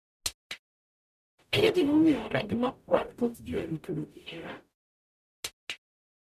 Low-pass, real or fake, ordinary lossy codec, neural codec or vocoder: 14.4 kHz; fake; none; codec, 44.1 kHz, 0.9 kbps, DAC